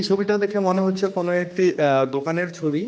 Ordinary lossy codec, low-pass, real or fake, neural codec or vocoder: none; none; fake; codec, 16 kHz, 2 kbps, X-Codec, HuBERT features, trained on general audio